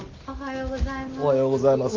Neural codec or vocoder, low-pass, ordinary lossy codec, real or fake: none; 7.2 kHz; Opus, 24 kbps; real